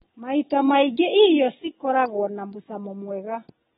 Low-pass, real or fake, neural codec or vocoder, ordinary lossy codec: 19.8 kHz; real; none; AAC, 16 kbps